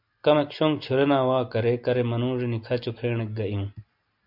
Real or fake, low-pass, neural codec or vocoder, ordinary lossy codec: real; 5.4 kHz; none; MP3, 48 kbps